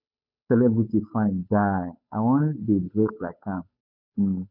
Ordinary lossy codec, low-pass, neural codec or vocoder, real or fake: none; 5.4 kHz; codec, 16 kHz, 8 kbps, FunCodec, trained on Chinese and English, 25 frames a second; fake